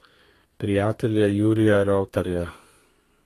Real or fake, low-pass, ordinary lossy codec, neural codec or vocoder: fake; 14.4 kHz; AAC, 48 kbps; codec, 32 kHz, 1.9 kbps, SNAC